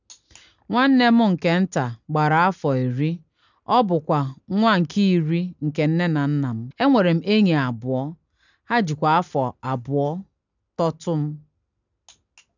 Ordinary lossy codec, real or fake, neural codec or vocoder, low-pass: none; real; none; 7.2 kHz